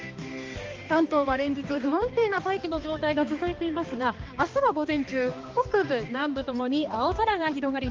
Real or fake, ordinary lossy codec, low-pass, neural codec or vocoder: fake; Opus, 32 kbps; 7.2 kHz; codec, 16 kHz, 2 kbps, X-Codec, HuBERT features, trained on balanced general audio